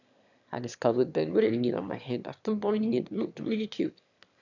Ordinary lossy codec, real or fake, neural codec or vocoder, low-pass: none; fake; autoencoder, 22.05 kHz, a latent of 192 numbers a frame, VITS, trained on one speaker; 7.2 kHz